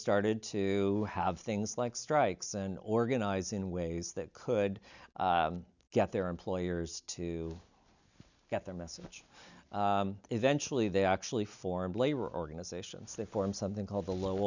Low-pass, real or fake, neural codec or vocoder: 7.2 kHz; real; none